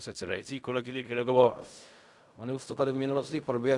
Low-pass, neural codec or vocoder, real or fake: 10.8 kHz; codec, 16 kHz in and 24 kHz out, 0.4 kbps, LongCat-Audio-Codec, fine tuned four codebook decoder; fake